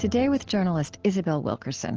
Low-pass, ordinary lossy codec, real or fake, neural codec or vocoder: 7.2 kHz; Opus, 16 kbps; real; none